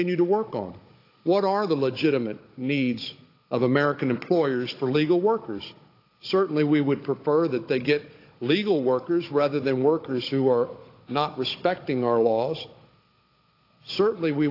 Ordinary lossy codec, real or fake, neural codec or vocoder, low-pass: AAC, 32 kbps; fake; codec, 16 kHz, 16 kbps, FunCodec, trained on Chinese and English, 50 frames a second; 5.4 kHz